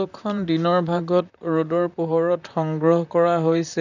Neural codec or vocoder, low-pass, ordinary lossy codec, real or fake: vocoder, 44.1 kHz, 128 mel bands, Pupu-Vocoder; 7.2 kHz; none; fake